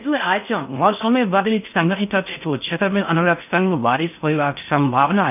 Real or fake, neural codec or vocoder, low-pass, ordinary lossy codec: fake; codec, 16 kHz in and 24 kHz out, 0.6 kbps, FocalCodec, streaming, 4096 codes; 3.6 kHz; none